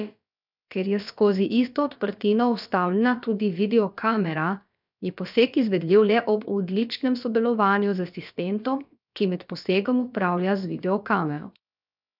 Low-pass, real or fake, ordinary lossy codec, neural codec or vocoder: 5.4 kHz; fake; none; codec, 16 kHz, about 1 kbps, DyCAST, with the encoder's durations